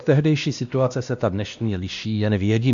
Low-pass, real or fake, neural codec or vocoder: 7.2 kHz; fake; codec, 16 kHz, 1 kbps, X-Codec, WavLM features, trained on Multilingual LibriSpeech